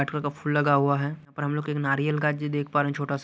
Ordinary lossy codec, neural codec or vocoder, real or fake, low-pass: none; none; real; none